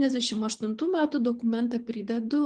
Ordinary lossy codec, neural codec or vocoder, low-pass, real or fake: Opus, 24 kbps; codec, 24 kHz, 6 kbps, HILCodec; 9.9 kHz; fake